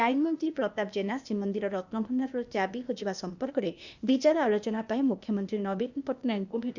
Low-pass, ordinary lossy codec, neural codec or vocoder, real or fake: 7.2 kHz; none; codec, 16 kHz, 0.8 kbps, ZipCodec; fake